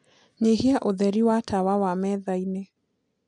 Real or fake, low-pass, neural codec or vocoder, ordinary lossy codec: real; 9.9 kHz; none; MP3, 64 kbps